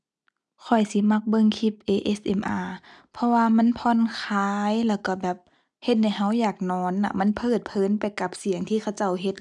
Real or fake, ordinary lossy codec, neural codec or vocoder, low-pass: real; none; none; none